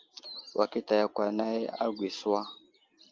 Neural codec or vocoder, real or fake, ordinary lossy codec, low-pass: none; real; Opus, 32 kbps; 7.2 kHz